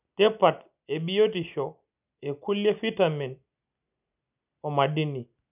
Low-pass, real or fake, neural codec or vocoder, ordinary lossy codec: 3.6 kHz; real; none; none